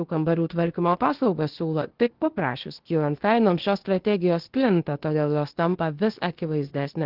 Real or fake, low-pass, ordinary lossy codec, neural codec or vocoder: fake; 5.4 kHz; Opus, 16 kbps; codec, 16 kHz, 0.8 kbps, ZipCodec